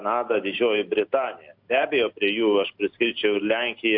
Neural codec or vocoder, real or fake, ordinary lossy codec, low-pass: none; real; AAC, 48 kbps; 5.4 kHz